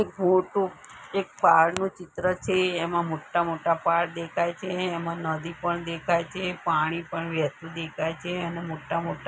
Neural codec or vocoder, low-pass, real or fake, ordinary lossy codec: none; none; real; none